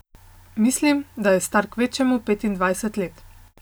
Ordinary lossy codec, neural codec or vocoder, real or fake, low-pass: none; none; real; none